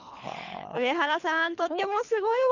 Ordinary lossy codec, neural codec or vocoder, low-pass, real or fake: none; codec, 24 kHz, 3 kbps, HILCodec; 7.2 kHz; fake